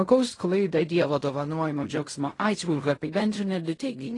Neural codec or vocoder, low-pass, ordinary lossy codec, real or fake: codec, 16 kHz in and 24 kHz out, 0.4 kbps, LongCat-Audio-Codec, fine tuned four codebook decoder; 10.8 kHz; AAC, 32 kbps; fake